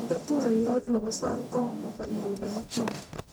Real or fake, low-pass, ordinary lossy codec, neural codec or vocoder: fake; none; none; codec, 44.1 kHz, 0.9 kbps, DAC